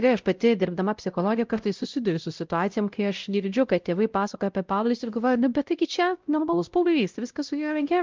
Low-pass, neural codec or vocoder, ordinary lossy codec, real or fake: 7.2 kHz; codec, 16 kHz, 0.5 kbps, X-Codec, WavLM features, trained on Multilingual LibriSpeech; Opus, 32 kbps; fake